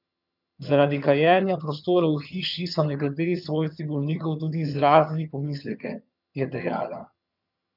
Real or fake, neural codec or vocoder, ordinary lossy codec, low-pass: fake; vocoder, 22.05 kHz, 80 mel bands, HiFi-GAN; none; 5.4 kHz